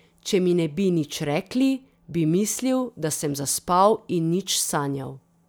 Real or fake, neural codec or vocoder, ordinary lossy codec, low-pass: real; none; none; none